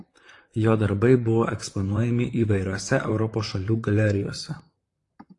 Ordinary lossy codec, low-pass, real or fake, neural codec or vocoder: AAC, 48 kbps; 10.8 kHz; fake; vocoder, 44.1 kHz, 128 mel bands, Pupu-Vocoder